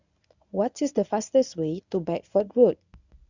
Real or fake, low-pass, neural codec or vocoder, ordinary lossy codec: fake; 7.2 kHz; codec, 24 kHz, 0.9 kbps, WavTokenizer, medium speech release version 1; none